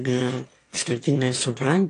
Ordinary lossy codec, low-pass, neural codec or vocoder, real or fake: AAC, 48 kbps; 9.9 kHz; autoencoder, 22.05 kHz, a latent of 192 numbers a frame, VITS, trained on one speaker; fake